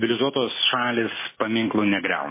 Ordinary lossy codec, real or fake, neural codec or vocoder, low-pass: MP3, 16 kbps; real; none; 3.6 kHz